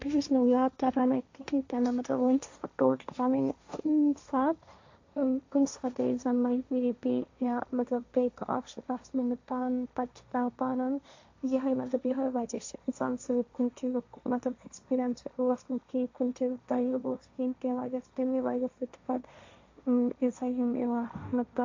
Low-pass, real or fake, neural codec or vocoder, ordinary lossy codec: 7.2 kHz; fake; codec, 16 kHz, 1.1 kbps, Voila-Tokenizer; AAC, 48 kbps